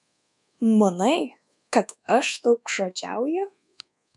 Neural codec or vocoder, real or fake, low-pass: codec, 24 kHz, 1.2 kbps, DualCodec; fake; 10.8 kHz